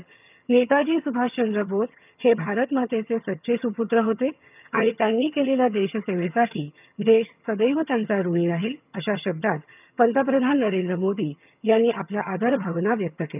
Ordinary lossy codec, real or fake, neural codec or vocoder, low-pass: none; fake; vocoder, 22.05 kHz, 80 mel bands, HiFi-GAN; 3.6 kHz